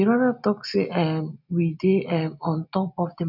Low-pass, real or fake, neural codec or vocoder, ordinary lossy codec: 5.4 kHz; real; none; none